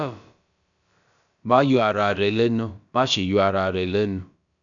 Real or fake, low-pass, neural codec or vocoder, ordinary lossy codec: fake; 7.2 kHz; codec, 16 kHz, about 1 kbps, DyCAST, with the encoder's durations; none